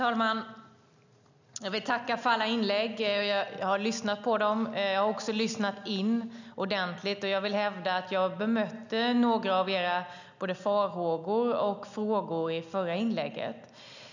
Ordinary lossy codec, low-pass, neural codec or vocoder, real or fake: none; 7.2 kHz; none; real